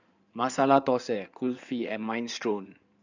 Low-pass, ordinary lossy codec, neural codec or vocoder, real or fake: 7.2 kHz; none; codec, 16 kHz in and 24 kHz out, 2.2 kbps, FireRedTTS-2 codec; fake